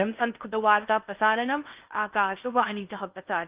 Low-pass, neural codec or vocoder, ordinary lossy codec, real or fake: 3.6 kHz; codec, 16 kHz in and 24 kHz out, 0.6 kbps, FocalCodec, streaming, 4096 codes; Opus, 32 kbps; fake